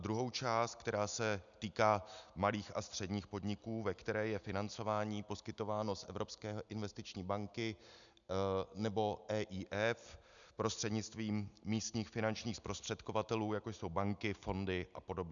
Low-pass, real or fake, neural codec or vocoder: 7.2 kHz; real; none